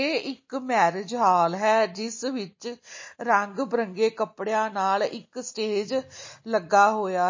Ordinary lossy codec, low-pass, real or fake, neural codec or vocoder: MP3, 32 kbps; 7.2 kHz; real; none